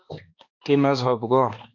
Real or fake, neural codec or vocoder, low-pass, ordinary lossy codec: fake; codec, 16 kHz, 2 kbps, X-Codec, HuBERT features, trained on balanced general audio; 7.2 kHz; MP3, 48 kbps